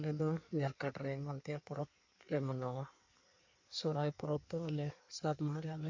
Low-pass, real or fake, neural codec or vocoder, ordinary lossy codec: 7.2 kHz; fake; codec, 16 kHz in and 24 kHz out, 1.1 kbps, FireRedTTS-2 codec; MP3, 64 kbps